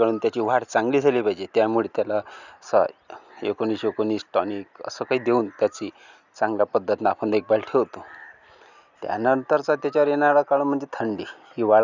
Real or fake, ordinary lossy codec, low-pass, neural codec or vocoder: real; none; 7.2 kHz; none